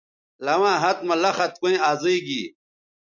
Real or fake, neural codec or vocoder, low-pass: real; none; 7.2 kHz